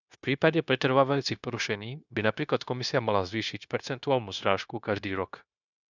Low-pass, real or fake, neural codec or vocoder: 7.2 kHz; fake; codec, 16 kHz, 0.9 kbps, LongCat-Audio-Codec